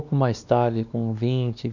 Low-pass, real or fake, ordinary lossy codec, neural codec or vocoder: 7.2 kHz; fake; none; codec, 16 kHz, 2 kbps, X-Codec, WavLM features, trained on Multilingual LibriSpeech